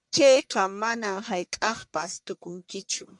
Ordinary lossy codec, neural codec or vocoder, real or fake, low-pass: MP3, 96 kbps; codec, 44.1 kHz, 1.7 kbps, Pupu-Codec; fake; 10.8 kHz